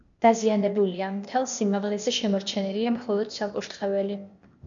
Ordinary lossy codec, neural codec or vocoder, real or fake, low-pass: MP3, 64 kbps; codec, 16 kHz, 0.8 kbps, ZipCodec; fake; 7.2 kHz